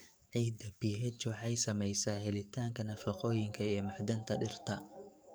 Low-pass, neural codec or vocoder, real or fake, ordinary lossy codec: none; codec, 44.1 kHz, 7.8 kbps, DAC; fake; none